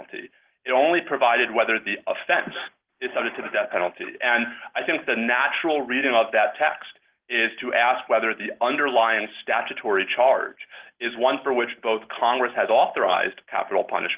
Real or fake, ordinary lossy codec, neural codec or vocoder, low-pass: real; Opus, 16 kbps; none; 3.6 kHz